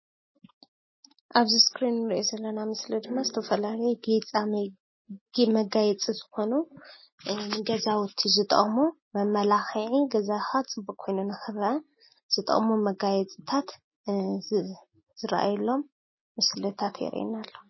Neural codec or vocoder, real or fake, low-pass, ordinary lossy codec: none; real; 7.2 kHz; MP3, 24 kbps